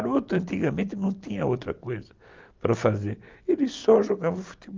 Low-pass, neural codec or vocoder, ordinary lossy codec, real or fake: 7.2 kHz; none; Opus, 24 kbps; real